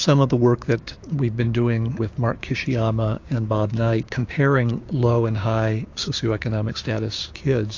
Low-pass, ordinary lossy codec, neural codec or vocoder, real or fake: 7.2 kHz; AAC, 48 kbps; codec, 16 kHz, 6 kbps, DAC; fake